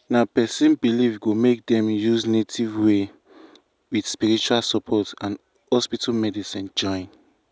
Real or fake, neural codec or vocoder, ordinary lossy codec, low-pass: real; none; none; none